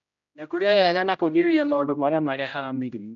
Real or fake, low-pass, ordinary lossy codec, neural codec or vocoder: fake; 7.2 kHz; none; codec, 16 kHz, 0.5 kbps, X-Codec, HuBERT features, trained on general audio